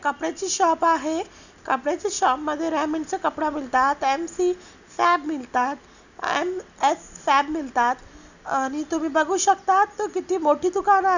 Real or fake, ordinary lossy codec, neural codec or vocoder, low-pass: real; none; none; 7.2 kHz